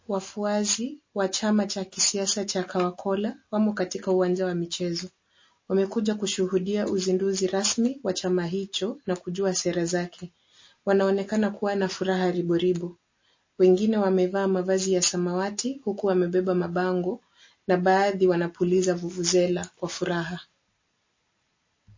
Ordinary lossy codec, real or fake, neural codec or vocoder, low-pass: MP3, 32 kbps; real; none; 7.2 kHz